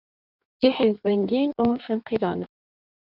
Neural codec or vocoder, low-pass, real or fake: codec, 16 kHz in and 24 kHz out, 1.1 kbps, FireRedTTS-2 codec; 5.4 kHz; fake